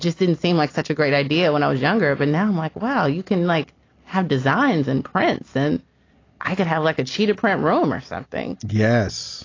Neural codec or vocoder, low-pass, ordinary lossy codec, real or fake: none; 7.2 kHz; AAC, 32 kbps; real